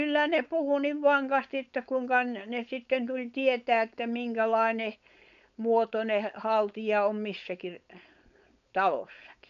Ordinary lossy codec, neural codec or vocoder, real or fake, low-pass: none; codec, 16 kHz, 4.8 kbps, FACodec; fake; 7.2 kHz